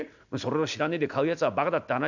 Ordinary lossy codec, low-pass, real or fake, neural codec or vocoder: none; 7.2 kHz; real; none